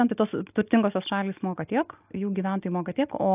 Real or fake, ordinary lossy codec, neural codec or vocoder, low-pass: real; AAC, 32 kbps; none; 3.6 kHz